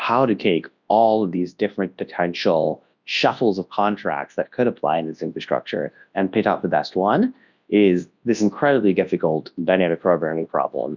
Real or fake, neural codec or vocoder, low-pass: fake; codec, 24 kHz, 0.9 kbps, WavTokenizer, large speech release; 7.2 kHz